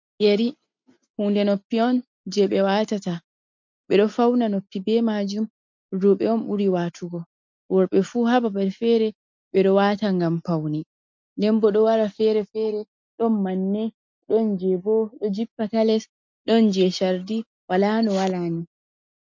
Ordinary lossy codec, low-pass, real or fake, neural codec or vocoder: MP3, 48 kbps; 7.2 kHz; real; none